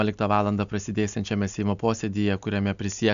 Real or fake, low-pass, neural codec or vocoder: real; 7.2 kHz; none